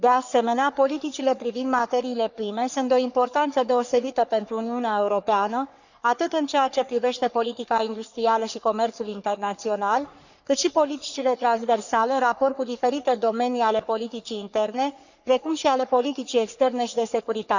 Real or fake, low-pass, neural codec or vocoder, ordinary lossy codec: fake; 7.2 kHz; codec, 44.1 kHz, 3.4 kbps, Pupu-Codec; none